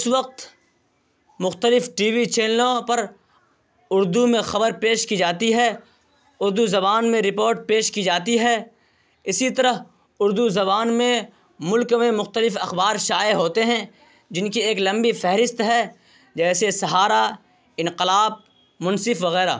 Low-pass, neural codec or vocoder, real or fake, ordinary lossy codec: none; none; real; none